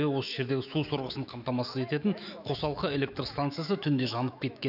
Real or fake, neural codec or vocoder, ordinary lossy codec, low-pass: fake; autoencoder, 48 kHz, 128 numbers a frame, DAC-VAE, trained on Japanese speech; none; 5.4 kHz